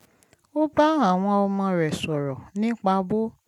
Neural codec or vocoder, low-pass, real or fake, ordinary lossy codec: none; 19.8 kHz; real; none